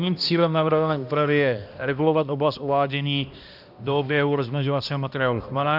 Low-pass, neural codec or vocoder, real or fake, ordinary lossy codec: 5.4 kHz; codec, 16 kHz, 1 kbps, X-Codec, HuBERT features, trained on balanced general audio; fake; AAC, 48 kbps